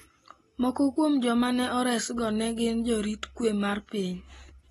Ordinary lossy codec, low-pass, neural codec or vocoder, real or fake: AAC, 32 kbps; 19.8 kHz; none; real